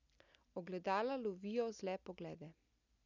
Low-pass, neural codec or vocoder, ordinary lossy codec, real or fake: 7.2 kHz; none; none; real